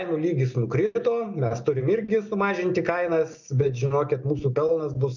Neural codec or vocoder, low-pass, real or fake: none; 7.2 kHz; real